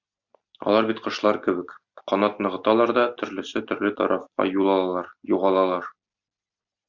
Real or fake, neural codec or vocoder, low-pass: real; none; 7.2 kHz